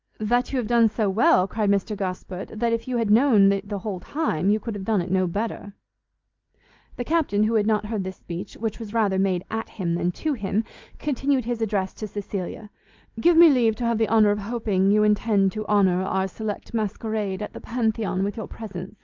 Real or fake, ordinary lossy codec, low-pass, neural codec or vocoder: real; Opus, 32 kbps; 7.2 kHz; none